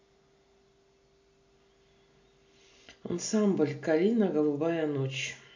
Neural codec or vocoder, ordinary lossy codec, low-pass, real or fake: none; MP3, 48 kbps; 7.2 kHz; real